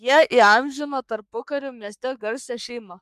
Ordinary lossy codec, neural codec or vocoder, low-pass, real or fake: MP3, 96 kbps; codec, 44.1 kHz, 3.4 kbps, Pupu-Codec; 14.4 kHz; fake